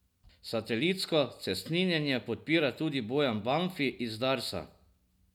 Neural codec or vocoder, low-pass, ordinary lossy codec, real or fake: none; 19.8 kHz; none; real